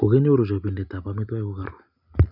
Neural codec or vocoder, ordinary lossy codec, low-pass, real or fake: none; none; 5.4 kHz; real